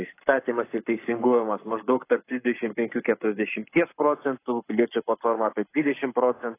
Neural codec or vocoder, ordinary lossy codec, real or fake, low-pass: codec, 44.1 kHz, 7.8 kbps, Pupu-Codec; AAC, 24 kbps; fake; 3.6 kHz